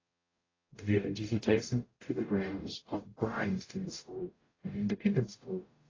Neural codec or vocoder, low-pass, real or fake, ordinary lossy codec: codec, 44.1 kHz, 0.9 kbps, DAC; 7.2 kHz; fake; AAC, 32 kbps